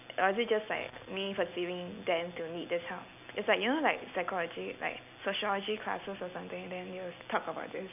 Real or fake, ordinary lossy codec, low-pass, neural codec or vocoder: real; none; 3.6 kHz; none